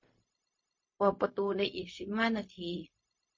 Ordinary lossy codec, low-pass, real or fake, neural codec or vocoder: MP3, 32 kbps; 7.2 kHz; fake; codec, 16 kHz, 0.4 kbps, LongCat-Audio-Codec